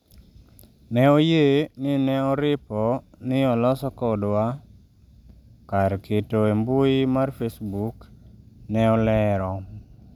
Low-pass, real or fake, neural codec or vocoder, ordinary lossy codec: 19.8 kHz; real; none; none